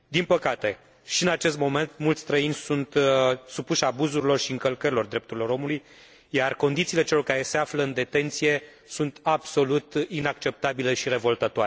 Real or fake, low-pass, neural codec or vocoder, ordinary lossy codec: real; none; none; none